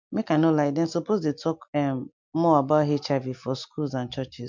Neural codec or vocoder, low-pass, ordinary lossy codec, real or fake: none; 7.2 kHz; MP3, 64 kbps; real